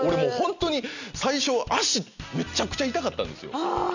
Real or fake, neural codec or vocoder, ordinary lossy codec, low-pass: real; none; none; 7.2 kHz